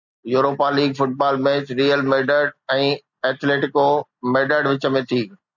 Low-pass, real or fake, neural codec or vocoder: 7.2 kHz; real; none